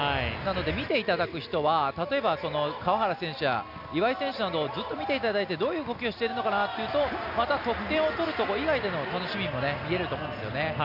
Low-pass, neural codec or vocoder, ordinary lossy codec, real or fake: 5.4 kHz; none; none; real